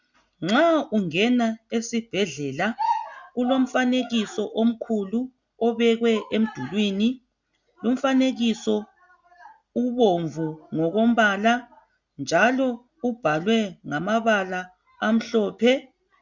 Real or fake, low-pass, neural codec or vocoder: real; 7.2 kHz; none